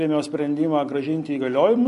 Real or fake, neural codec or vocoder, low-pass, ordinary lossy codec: fake; vocoder, 44.1 kHz, 128 mel bands every 256 samples, BigVGAN v2; 14.4 kHz; MP3, 48 kbps